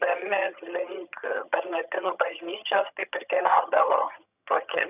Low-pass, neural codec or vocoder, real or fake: 3.6 kHz; vocoder, 22.05 kHz, 80 mel bands, HiFi-GAN; fake